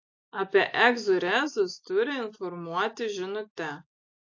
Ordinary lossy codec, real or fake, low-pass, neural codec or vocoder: AAC, 48 kbps; real; 7.2 kHz; none